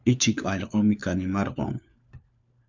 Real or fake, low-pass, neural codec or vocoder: fake; 7.2 kHz; codec, 16 kHz, 4 kbps, FunCodec, trained on LibriTTS, 50 frames a second